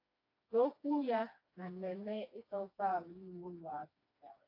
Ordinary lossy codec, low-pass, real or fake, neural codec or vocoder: AAC, 48 kbps; 5.4 kHz; fake; codec, 16 kHz, 2 kbps, FreqCodec, smaller model